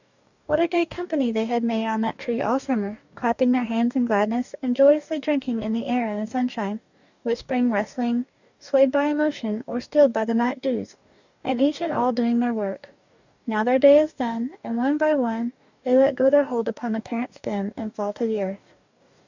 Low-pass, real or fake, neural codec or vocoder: 7.2 kHz; fake; codec, 44.1 kHz, 2.6 kbps, DAC